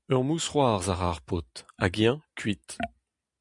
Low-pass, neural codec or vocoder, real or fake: 10.8 kHz; none; real